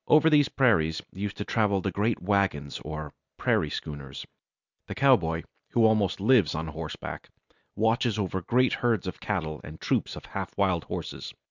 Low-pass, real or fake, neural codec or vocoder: 7.2 kHz; real; none